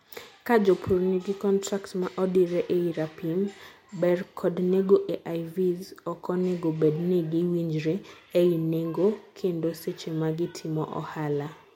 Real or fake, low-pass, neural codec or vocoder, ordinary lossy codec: real; 19.8 kHz; none; MP3, 64 kbps